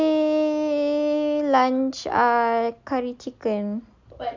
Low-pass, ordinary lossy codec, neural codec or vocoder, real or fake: 7.2 kHz; MP3, 64 kbps; none; real